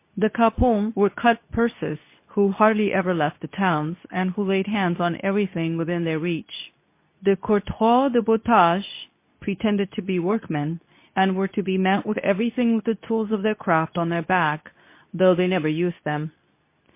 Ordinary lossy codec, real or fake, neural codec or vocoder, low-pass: MP3, 24 kbps; fake; codec, 24 kHz, 0.9 kbps, WavTokenizer, medium speech release version 2; 3.6 kHz